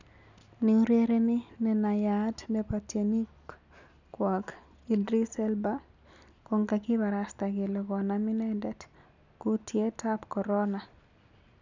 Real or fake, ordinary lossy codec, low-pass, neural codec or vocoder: real; none; 7.2 kHz; none